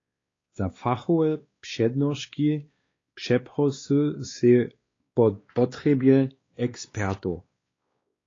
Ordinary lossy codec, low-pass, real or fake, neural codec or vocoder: AAC, 32 kbps; 7.2 kHz; fake; codec, 16 kHz, 2 kbps, X-Codec, WavLM features, trained on Multilingual LibriSpeech